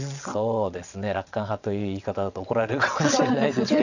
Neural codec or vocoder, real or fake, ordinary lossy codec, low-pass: vocoder, 22.05 kHz, 80 mel bands, WaveNeXt; fake; none; 7.2 kHz